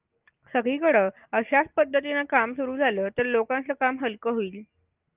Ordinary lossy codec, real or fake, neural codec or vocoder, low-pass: Opus, 32 kbps; real; none; 3.6 kHz